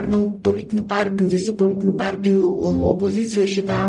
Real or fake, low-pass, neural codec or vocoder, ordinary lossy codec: fake; 10.8 kHz; codec, 44.1 kHz, 0.9 kbps, DAC; MP3, 96 kbps